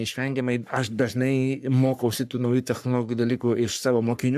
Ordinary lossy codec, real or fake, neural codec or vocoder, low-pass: AAC, 96 kbps; fake; codec, 44.1 kHz, 3.4 kbps, Pupu-Codec; 14.4 kHz